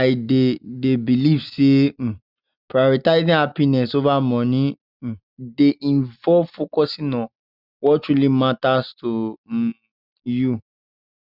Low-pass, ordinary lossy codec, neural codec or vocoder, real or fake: 5.4 kHz; none; none; real